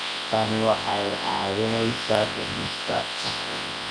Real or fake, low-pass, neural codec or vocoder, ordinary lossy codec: fake; 9.9 kHz; codec, 24 kHz, 0.9 kbps, WavTokenizer, large speech release; none